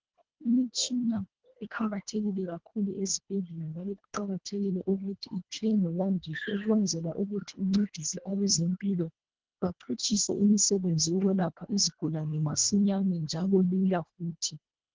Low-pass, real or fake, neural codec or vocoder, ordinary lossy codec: 7.2 kHz; fake; codec, 24 kHz, 1.5 kbps, HILCodec; Opus, 16 kbps